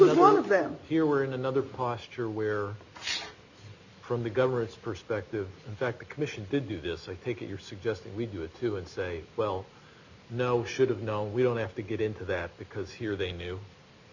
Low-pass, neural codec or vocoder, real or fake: 7.2 kHz; none; real